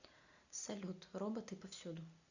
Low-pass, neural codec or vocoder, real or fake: 7.2 kHz; none; real